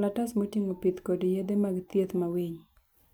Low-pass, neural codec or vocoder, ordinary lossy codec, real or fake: none; none; none; real